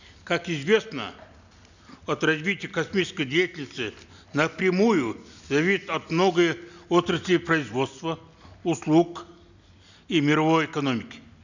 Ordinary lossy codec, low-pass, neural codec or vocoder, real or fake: none; 7.2 kHz; none; real